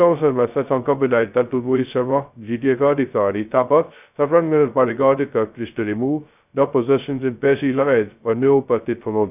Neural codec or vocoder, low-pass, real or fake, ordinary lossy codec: codec, 16 kHz, 0.2 kbps, FocalCodec; 3.6 kHz; fake; none